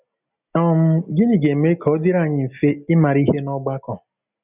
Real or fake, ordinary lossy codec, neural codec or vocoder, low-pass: real; none; none; 3.6 kHz